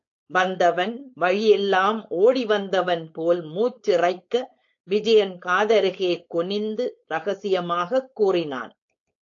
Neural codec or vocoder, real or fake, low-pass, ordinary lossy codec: codec, 16 kHz, 4.8 kbps, FACodec; fake; 7.2 kHz; AAC, 48 kbps